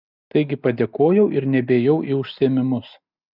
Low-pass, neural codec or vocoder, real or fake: 5.4 kHz; none; real